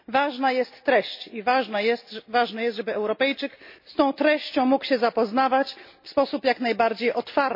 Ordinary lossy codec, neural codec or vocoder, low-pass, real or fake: MP3, 32 kbps; none; 5.4 kHz; real